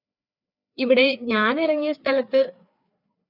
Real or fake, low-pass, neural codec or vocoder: fake; 5.4 kHz; codec, 16 kHz, 4 kbps, FreqCodec, larger model